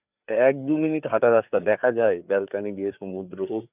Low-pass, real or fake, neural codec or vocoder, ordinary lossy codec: 3.6 kHz; fake; codec, 16 kHz, 4 kbps, FreqCodec, larger model; none